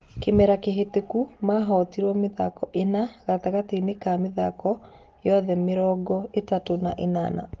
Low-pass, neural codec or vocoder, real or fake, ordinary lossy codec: 7.2 kHz; none; real; Opus, 16 kbps